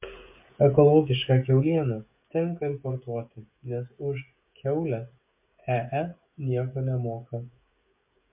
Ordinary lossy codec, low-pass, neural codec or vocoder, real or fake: MP3, 32 kbps; 3.6 kHz; codec, 16 kHz, 16 kbps, FreqCodec, smaller model; fake